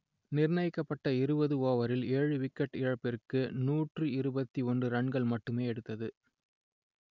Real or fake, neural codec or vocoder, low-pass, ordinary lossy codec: real; none; 7.2 kHz; none